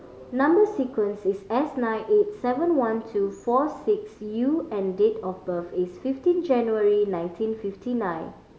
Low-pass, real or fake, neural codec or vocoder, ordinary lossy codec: none; real; none; none